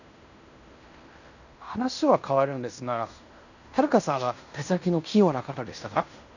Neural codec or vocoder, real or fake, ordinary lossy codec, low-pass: codec, 16 kHz in and 24 kHz out, 0.9 kbps, LongCat-Audio-Codec, fine tuned four codebook decoder; fake; none; 7.2 kHz